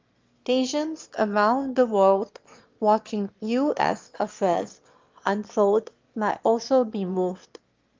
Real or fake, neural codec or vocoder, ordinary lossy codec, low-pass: fake; autoencoder, 22.05 kHz, a latent of 192 numbers a frame, VITS, trained on one speaker; Opus, 32 kbps; 7.2 kHz